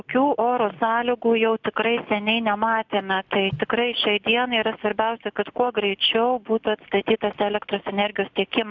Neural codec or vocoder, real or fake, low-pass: none; real; 7.2 kHz